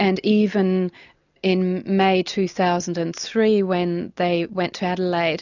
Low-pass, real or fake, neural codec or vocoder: 7.2 kHz; real; none